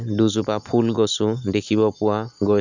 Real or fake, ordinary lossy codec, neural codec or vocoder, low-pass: real; none; none; 7.2 kHz